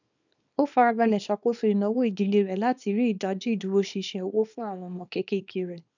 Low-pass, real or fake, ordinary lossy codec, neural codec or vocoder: 7.2 kHz; fake; none; codec, 24 kHz, 0.9 kbps, WavTokenizer, small release